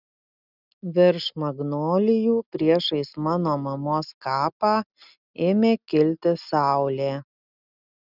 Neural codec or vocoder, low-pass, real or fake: none; 5.4 kHz; real